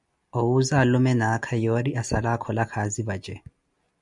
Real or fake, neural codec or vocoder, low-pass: real; none; 10.8 kHz